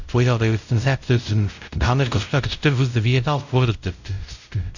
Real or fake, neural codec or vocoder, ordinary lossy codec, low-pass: fake; codec, 16 kHz, 0.5 kbps, X-Codec, WavLM features, trained on Multilingual LibriSpeech; none; 7.2 kHz